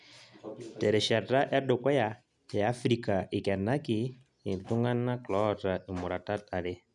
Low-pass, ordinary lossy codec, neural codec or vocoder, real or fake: 10.8 kHz; none; none; real